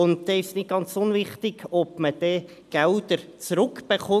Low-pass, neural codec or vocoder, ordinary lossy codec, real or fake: 14.4 kHz; none; none; real